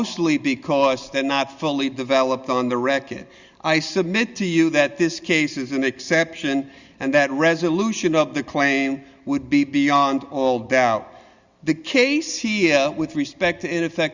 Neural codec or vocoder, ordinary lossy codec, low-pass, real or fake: none; Opus, 64 kbps; 7.2 kHz; real